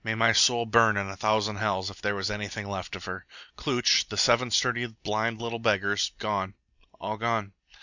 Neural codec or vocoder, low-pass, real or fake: none; 7.2 kHz; real